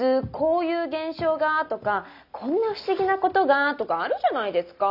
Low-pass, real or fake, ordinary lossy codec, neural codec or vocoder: 5.4 kHz; real; none; none